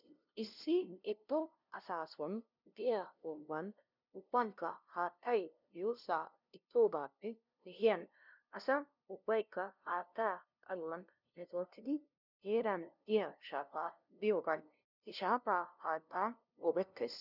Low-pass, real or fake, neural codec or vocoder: 5.4 kHz; fake; codec, 16 kHz, 0.5 kbps, FunCodec, trained on LibriTTS, 25 frames a second